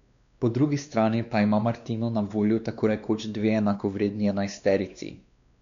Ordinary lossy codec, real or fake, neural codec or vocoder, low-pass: none; fake; codec, 16 kHz, 2 kbps, X-Codec, WavLM features, trained on Multilingual LibriSpeech; 7.2 kHz